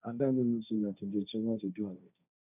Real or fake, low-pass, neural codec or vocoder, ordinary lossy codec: fake; 3.6 kHz; codec, 16 kHz, 1.1 kbps, Voila-Tokenizer; none